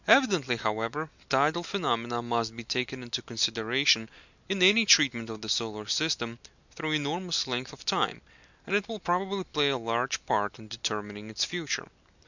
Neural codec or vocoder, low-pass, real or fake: none; 7.2 kHz; real